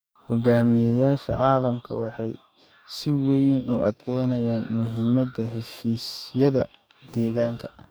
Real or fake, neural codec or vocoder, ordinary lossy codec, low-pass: fake; codec, 44.1 kHz, 2.6 kbps, DAC; none; none